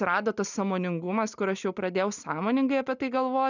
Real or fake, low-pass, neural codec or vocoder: real; 7.2 kHz; none